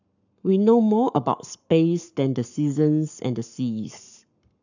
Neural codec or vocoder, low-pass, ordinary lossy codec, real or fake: codec, 44.1 kHz, 7.8 kbps, Pupu-Codec; 7.2 kHz; none; fake